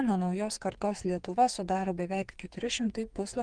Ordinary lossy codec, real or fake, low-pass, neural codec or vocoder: Opus, 24 kbps; fake; 9.9 kHz; codec, 44.1 kHz, 2.6 kbps, SNAC